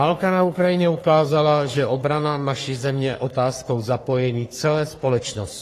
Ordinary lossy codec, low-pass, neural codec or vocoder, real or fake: AAC, 48 kbps; 14.4 kHz; codec, 44.1 kHz, 3.4 kbps, Pupu-Codec; fake